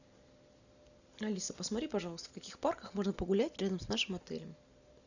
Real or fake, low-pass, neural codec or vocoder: real; 7.2 kHz; none